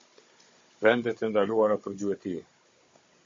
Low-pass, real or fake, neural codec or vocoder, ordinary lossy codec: 7.2 kHz; fake; codec, 16 kHz, 16 kbps, FunCodec, trained on Chinese and English, 50 frames a second; MP3, 32 kbps